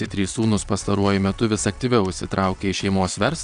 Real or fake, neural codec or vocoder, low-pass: fake; vocoder, 22.05 kHz, 80 mel bands, WaveNeXt; 9.9 kHz